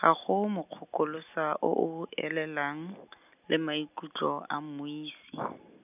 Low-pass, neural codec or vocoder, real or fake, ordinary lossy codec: 3.6 kHz; none; real; none